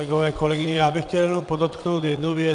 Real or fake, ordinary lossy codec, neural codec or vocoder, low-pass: fake; MP3, 96 kbps; vocoder, 22.05 kHz, 80 mel bands, WaveNeXt; 9.9 kHz